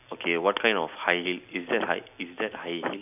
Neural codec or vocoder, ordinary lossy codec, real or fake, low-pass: none; none; real; 3.6 kHz